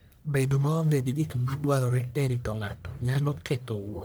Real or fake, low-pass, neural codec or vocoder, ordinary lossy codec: fake; none; codec, 44.1 kHz, 1.7 kbps, Pupu-Codec; none